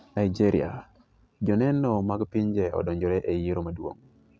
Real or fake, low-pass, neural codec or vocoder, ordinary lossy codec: real; none; none; none